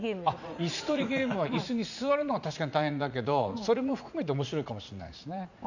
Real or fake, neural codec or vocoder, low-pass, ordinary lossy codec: fake; vocoder, 44.1 kHz, 80 mel bands, Vocos; 7.2 kHz; none